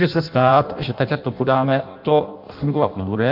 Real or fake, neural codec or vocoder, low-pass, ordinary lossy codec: fake; codec, 16 kHz in and 24 kHz out, 0.6 kbps, FireRedTTS-2 codec; 5.4 kHz; MP3, 48 kbps